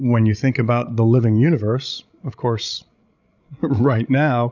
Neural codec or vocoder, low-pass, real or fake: codec, 16 kHz, 16 kbps, FreqCodec, larger model; 7.2 kHz; fake